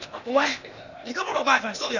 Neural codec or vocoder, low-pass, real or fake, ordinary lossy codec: codec, 16 kHz, 0.8 kbps, ZipCodec; 7.2 kHz; fake; none